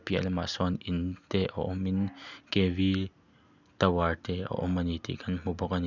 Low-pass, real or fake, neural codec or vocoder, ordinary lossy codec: 7.2 kHz; real; none; none